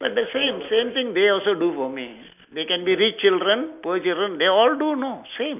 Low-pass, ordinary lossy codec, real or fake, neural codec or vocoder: 3.6 kHz; none; real; none